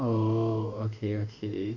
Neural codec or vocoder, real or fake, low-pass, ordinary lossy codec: autoencoder, 48 kHz, 32 numbers a frame, DAC-VAE, trained on Japanese speech; fake; 7.2 kHz; none